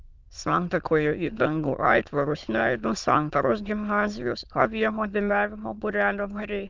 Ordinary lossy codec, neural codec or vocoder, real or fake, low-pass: Opus, 24 kbps; autoencoder, 22.05 kHz, a latent of 192 numbers a frame, VITS, trained on many speakers; fake; 7.2 kHz